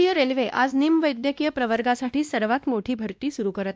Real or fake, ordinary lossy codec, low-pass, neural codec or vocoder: fake; none; none; codec, 16 kHz, 1 kbps, X-Codec, WavLM features, trained on Multilingual LibriSpeech